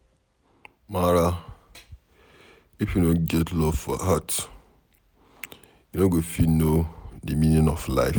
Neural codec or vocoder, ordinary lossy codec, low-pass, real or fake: none; none; none; real